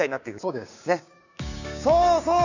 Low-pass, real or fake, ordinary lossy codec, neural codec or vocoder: 7.2 kHz; fake; none; codec, 16 kHz, 6 kbps, DAC